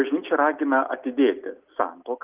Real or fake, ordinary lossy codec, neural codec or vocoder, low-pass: real; Opus, 24 kbps; none; 3.6 kHz